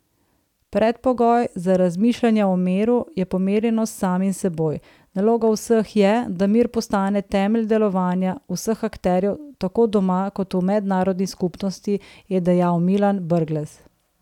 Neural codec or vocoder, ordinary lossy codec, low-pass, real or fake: none; none; 19.8 kHz; real